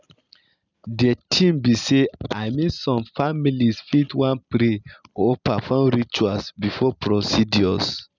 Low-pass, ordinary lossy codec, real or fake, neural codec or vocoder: 7.2 kHz; none; real; none